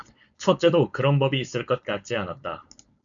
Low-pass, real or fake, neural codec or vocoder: 7.2 kHz; fake; codec, 16 kHz, 4.8 kbps, FACodec